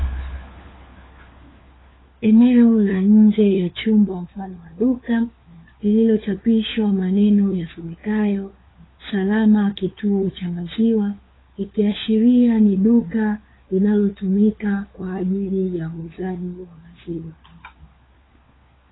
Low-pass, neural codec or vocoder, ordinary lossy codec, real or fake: 7.2 kHz; codec, 16 kHz, 4 kbps, FunCodec, trained on LibriTTS, 50 frames a second; AAC, 16 kbps; fake